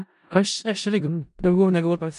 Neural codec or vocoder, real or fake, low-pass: codec, 16 kHz in and 24 kHz out, 0.4 kbps, LongCat-Audio-Codec, four codebook decoder; fake; 10.8 kHz